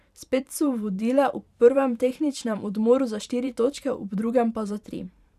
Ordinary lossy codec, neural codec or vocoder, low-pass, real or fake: none; vocoder, 44.1 kHz, 128 mel bands every 512 samples, BigVGAN v2; 14.4 kHz; fake